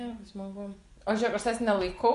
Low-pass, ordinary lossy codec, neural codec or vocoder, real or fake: 10.8 kHz; Opus, 64 kbps; vocoder, 24 kHz, 100 mel bands, Vocos; fake